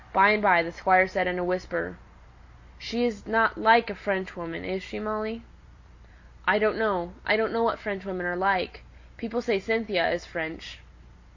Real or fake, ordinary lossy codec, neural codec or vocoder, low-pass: real; AAC, 48 kbps; none; 7.2 kHz